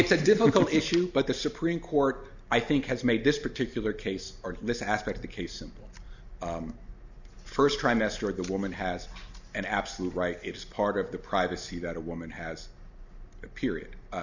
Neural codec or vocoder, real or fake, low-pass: none; real; 7.2 kHz